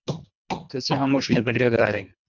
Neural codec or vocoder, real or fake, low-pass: codec, 24 kHz, 1.5 kbps, HILCodec; fake; 7.2 kHz